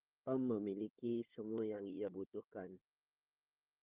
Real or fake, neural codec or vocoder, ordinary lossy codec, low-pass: fake; codec, 16 kHz, 4 kbps, FunCodec, trained on Chinese and English, 50 frames a second; Opus, 24 kbps; 3.6 kHz